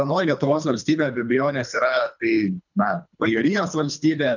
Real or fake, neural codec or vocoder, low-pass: fake; codec, 24 kHz, 3 kbps, HILCodec; 7.2 kHz